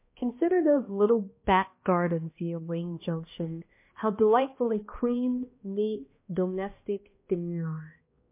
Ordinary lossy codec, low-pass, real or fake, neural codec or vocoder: MP3, 24 kbps; 3.6 kHz; fake; codec, 16 kHz, 1 kbps, X-Codec, HuBERT features, trained on balanced general audio